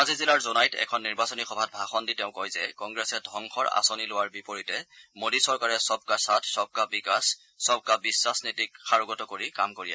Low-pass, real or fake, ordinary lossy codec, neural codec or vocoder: none; real; none; none